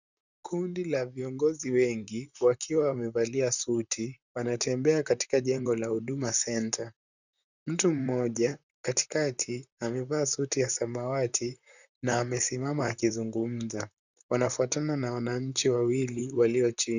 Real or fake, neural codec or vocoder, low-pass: fake; vocoder, 44.1 kHz, 128 mel bands, Pupu-Vocoder; 7.2 kHz